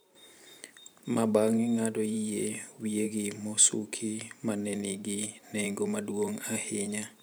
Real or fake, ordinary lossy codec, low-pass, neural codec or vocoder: fake; none; none; vocoder, 44.1 kHz, 128 mel bands every 512 samples, BigVGAN v2